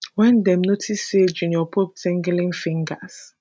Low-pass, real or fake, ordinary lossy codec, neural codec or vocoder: none; real; none; none